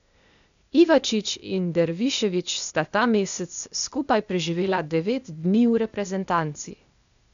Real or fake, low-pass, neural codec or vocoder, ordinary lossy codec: fake; 7.2 kHz; codec, 16 kHz, 0.8 kbps, ZipCodec; none